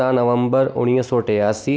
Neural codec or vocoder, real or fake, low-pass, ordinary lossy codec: none; real; none; none